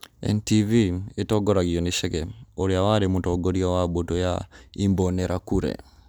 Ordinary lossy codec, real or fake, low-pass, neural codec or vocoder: none; real; none; none